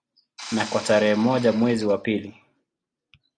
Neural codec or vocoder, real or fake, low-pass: none; real; 9.9 kHz